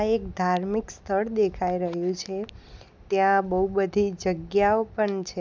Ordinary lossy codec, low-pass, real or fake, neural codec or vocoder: none; none; real; none